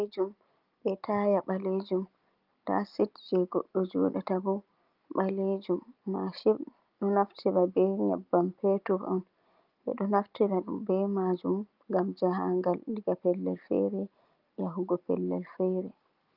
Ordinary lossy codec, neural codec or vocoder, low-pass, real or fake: Opus, 24 kbps; none; 5.4 kHz; real